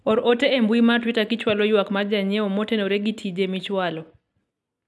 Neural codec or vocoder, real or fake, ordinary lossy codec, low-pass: none; real; none; none